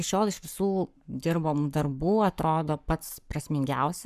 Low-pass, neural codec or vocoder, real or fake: 14.4 kHz; codec, 44.1 kHz, 7.8 kbps, Pupu-Codec; fake